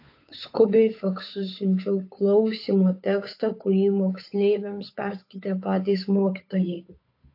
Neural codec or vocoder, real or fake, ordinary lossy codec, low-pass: codec, 16 kHz, 8 kbps, FunCodec, trained on Chinese and English, 25 frames a second; fake; AAC, 32 kbps; 5.4 kHz